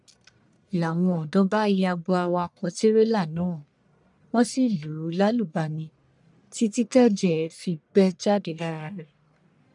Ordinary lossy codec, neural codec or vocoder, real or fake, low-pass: none; codec, 44.1 kHz, 1.7 kbps, Pupu-Codec; fake; 10.8 kHz